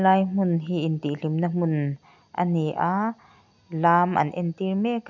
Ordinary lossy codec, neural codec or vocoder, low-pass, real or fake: none; none; 7.2 kHz; real